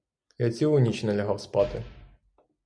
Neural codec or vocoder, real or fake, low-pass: none; real; 9.9 kHz